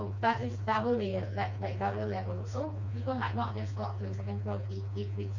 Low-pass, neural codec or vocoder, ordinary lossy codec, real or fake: 7.2 kHz; codec, 16 kHz, 2 kbps, FreqCodec, smaller model; none; fake